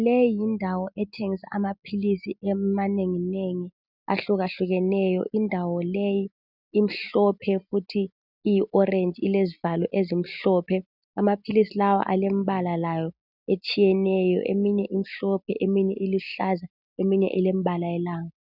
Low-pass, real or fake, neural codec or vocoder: 5.4 kHz; real; none